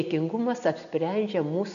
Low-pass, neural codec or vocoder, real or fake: 7.2 kHz; none; real